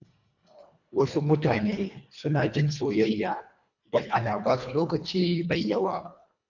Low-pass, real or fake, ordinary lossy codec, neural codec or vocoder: 7.2 kHz; fake; none; codec, 24 kHz, 1.5 kbps, HILCodec